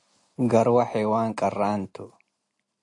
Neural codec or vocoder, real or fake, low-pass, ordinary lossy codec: none; real; 10.8 kHz; AAC, 48 kbps